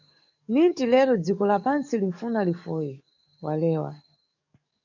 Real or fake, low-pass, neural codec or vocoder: fake; 7.2 kHz; codec, 44.1 kHz, 7.8 kbps, DAC